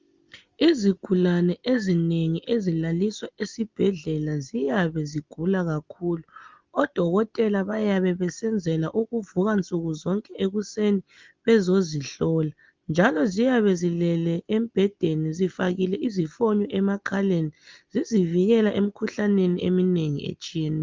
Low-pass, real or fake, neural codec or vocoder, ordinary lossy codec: 7.2 kHz; real; none; Opus, 32 kbps